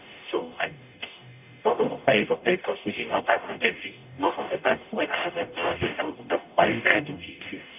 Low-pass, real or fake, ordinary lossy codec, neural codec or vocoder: 3.6 kHz; fake; none; codec, 44.1 kHz, 0.9 kbps, DAC